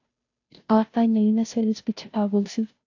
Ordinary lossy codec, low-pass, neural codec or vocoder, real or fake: AAC, 48 kbps; 7.2 kHz; codec, 16 kHz, 0.5 kbps, FunCodec, trained on Chinese and English, 25 frames a second; fake